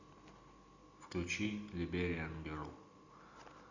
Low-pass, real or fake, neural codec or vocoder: 7.2 kHz; real; none